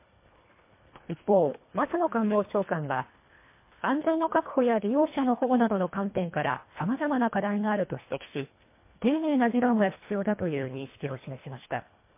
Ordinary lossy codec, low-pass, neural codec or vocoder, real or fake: MP3, 24 kbps; 3.6 kHz; codec, 24 kHz, 1.5 kbps, HILCodec; fake